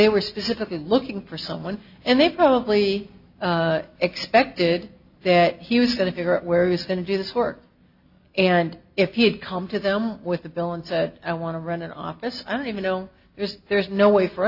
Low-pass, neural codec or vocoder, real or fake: 5.4 kHz; none; real